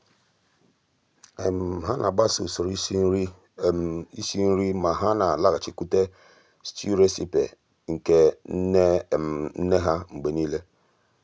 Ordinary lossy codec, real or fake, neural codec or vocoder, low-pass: none; real; none; none